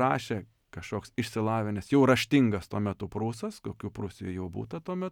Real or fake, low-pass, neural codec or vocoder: real; 19.8 kHz; none